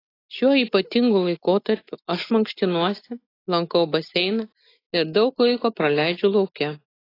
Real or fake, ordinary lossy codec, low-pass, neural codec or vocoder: real; AAC, 24 kbps; 5.4 kHz; none